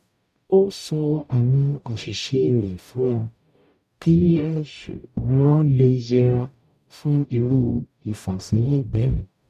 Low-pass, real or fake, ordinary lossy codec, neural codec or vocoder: 14.4 kHz; fake; none; codec, 44.1 kHz, 0.9 kbps, DAC